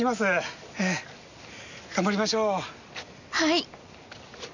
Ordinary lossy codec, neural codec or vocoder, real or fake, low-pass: none; none; real; 7.2 kHz